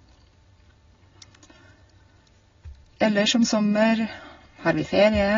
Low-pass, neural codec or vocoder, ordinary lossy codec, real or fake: 7.2 kHz; none; AAC, 24 kbps; real